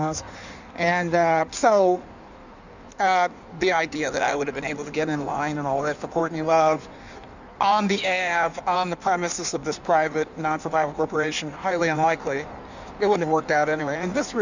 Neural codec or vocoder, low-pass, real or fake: codec, 16 kHz in and 24 kHz out, 1.1 kbps, FireRedTTS-2 codec; 7.2 kHz; fake